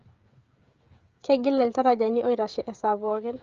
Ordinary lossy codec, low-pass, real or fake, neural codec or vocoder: Opus, 32 kbps; 7.2 kHz; fake; codec, 16 kHz, 16 kbps, FreqCodec, smaller model